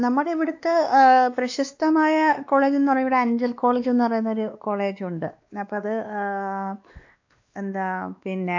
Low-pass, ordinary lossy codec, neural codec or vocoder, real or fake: 7.2 kHz; AAC, 48 kbps; codec, 16 kHz, 2 kbps, X-Codec, WavLM features, trained on Multilingual LibriSpeech; fake